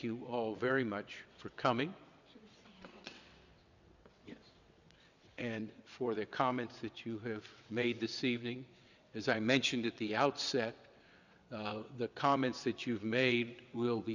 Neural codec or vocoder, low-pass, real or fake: vocoder, 22.05 kHz, 80 mel bands, WaveNeXt; 7.2 kHz; fake